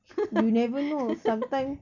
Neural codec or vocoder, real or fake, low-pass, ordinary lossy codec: none; real; 7.2 kHz; none